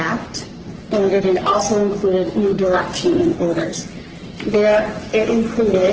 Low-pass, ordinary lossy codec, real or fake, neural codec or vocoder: 7.2 kHz; Opus, 16 kbps; fake; codec, 44.1 kHz, 3.4 kbps, Pupu-Codec